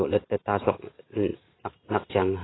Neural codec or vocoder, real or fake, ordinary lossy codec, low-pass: none; real; AAC, 16 kbps; 7.2 kHz